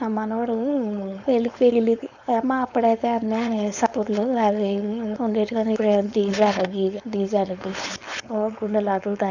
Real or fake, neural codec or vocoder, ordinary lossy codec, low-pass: fake; codec, 16 kHz, 4.8 kbps, FACodec; none; 7.2 kHz